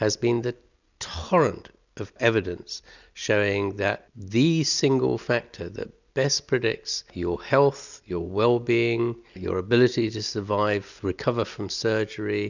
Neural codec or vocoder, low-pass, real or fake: none; 7.2 kHz; real